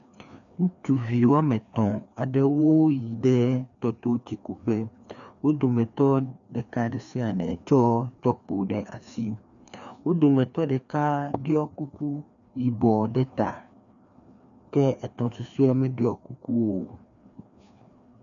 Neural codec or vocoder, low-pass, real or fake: codec, 16 kHz, 2 kbps, FreqCodec, larger model; 7.2 kHz; fake